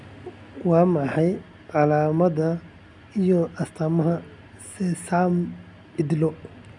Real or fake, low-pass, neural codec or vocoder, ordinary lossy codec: real; 10.8 kHz; none; none